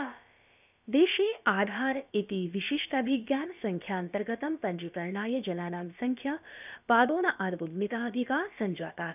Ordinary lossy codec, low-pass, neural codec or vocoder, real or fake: none; 3.6 kHz; codec, 16 kHz, about 1 kbps, DyCAST, with the encoder's durations; fake